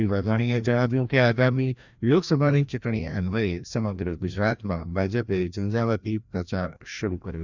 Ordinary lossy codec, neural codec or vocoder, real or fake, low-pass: none; codec, 16 kHz, 1 kbps, FreqCodec, larger model; fake; 7.2 kHz